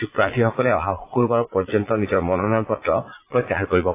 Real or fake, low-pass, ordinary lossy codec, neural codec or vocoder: fake; 3.6 kHz; AAC, 24 kbps; vocoder, 22.05 kHz, 80 mel bands, Vocos